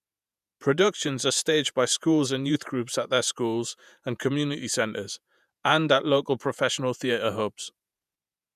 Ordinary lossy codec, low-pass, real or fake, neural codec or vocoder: none; 14.4 kHz; fake; vocoder, 48 kHz, 128 mel bands, Vocos